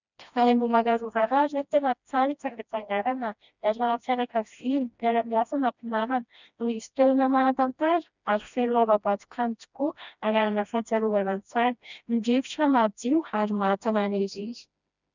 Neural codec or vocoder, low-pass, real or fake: codec, 16 kHz, 1 kbps, FreqCodec, smaller model; 7.2 kHz; fake